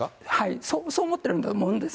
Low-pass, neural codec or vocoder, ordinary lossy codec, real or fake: none; none; none; real